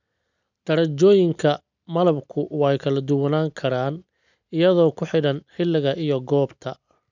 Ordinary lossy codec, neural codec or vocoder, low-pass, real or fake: none; none; 7.2 kHz; real